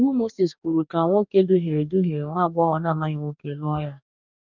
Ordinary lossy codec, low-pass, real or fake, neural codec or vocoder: none; 7.2 kHz; fake; codec, 44.1 kHz, 2.6 kbps, DAC